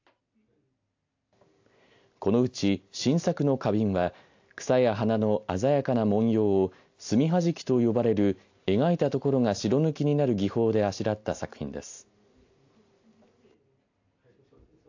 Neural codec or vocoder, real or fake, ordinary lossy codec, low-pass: none; real; AAC, 48 kbps; 7.2 kHz